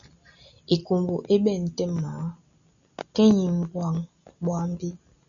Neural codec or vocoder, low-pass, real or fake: none; 7.2 kHz; real